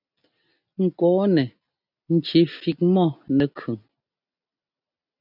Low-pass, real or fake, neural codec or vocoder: 5.4 kHz; real; none